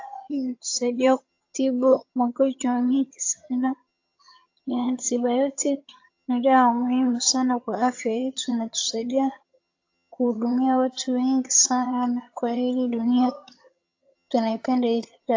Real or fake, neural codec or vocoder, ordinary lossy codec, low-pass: fake; vocoder, 22.05 kHz, 80 mel bands, HiFi-GAN; AAC, 48 kbps; 7.2 kHz